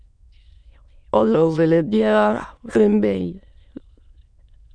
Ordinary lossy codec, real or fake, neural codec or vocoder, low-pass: MP3, 96 kbps; fake; autoencoder, 22.05 kHz, a latent of 192 numbers a frame, VITS, trained on many speakers; 9.9 kHz